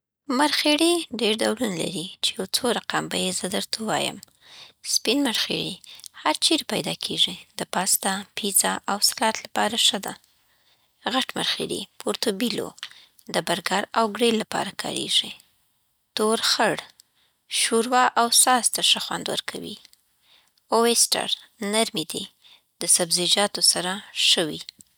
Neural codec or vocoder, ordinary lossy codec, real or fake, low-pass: none; none; real; none